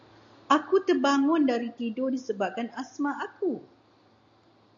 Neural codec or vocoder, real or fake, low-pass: none; real; 7.2 kHz